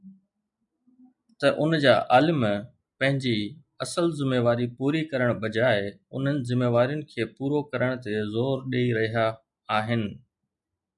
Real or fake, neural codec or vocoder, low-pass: real; none; 10.8 kHz